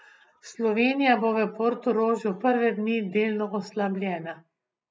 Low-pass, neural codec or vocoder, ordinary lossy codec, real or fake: none; none; none; real